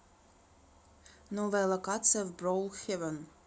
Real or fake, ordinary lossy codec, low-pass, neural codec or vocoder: real; none; none; none